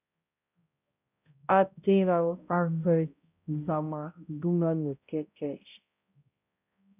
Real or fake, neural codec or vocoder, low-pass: fake; codec, 16 kHz, 0.5 kbps, X-Codec, HuBERT features, trained on balanced general audio; 3.6 kHz